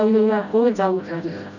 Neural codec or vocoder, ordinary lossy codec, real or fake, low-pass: codec, 16 kHz, 0.5 kbps, FreqCodec, smaller model; none; fake; 7.2 kHz